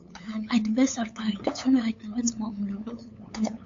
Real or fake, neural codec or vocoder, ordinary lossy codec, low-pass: fake; codec, 16 kHz, 8 kbps, FunCodec, trained on LibriTTS, 25 frames a second; AAC, 48 kbps; 7.2 kHz